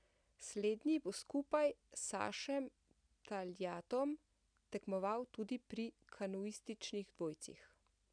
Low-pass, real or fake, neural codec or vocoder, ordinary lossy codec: 9.9 kHz; real; none; none